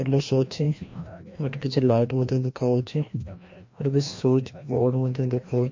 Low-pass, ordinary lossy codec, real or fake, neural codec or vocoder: 7.2 kHz; MP3, 48 kbps; fake; codec, 16 kHz, 1 kbps, FreqCodec, larger model